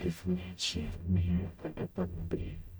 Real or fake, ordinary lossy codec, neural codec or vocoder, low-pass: fake; none; codec, 44.1 kHz, 0.9 kbps, DAC; none